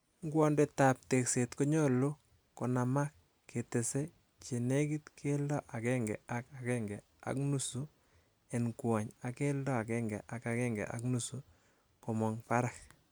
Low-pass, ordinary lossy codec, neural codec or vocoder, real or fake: none; none; none; real